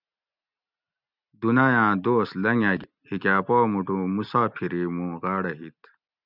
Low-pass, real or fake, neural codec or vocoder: 5.4 kHz; real; none